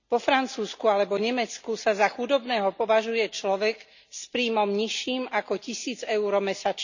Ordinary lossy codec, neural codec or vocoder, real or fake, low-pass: none; none; real; 7.2 kHz